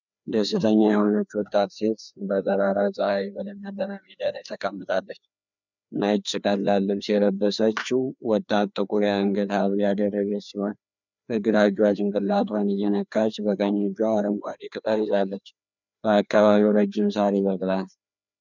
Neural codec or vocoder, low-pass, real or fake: codec, 16 kHz, 2 kbps, FreqCodec, larger model; 7.2 kHz; fake